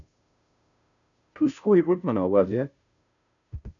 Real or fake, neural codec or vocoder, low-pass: fake; codec, 16 kHz, 0.5 kbps, FunCodec, trained on Chinese and English, 25 frames a second; 7.2 kHz